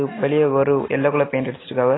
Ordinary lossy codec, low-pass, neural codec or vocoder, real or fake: AAC, 16 kbps; 7.2 kHz; none; real